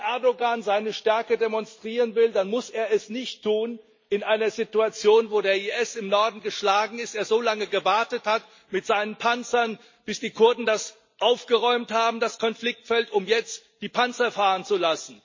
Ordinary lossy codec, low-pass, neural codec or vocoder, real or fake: MP3, 32 kbps; 7.2 kHz; none; real